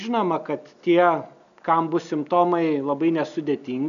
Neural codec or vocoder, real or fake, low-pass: none; real; 7.2 kHz